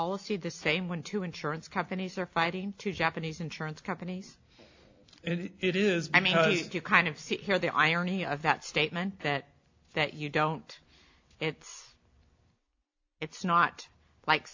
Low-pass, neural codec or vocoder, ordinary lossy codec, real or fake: 7.2 kHz; none; AAC, 48 kbps; real